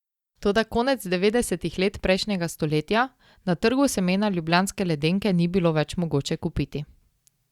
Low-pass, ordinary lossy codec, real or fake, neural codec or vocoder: 19.8 kHz; Opus, 64 kbps; real; none